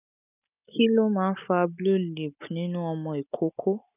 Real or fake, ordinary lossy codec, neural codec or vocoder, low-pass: real; none; none; 3.6 kHz